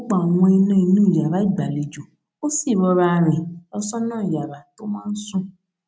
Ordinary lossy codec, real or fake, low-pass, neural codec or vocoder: none; real; none; none